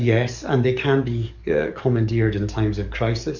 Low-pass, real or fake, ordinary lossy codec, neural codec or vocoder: 7.2 kHz; fake; Opus, 64 kbps; codec, 44.1 kHz, 7.8 kbps, DAC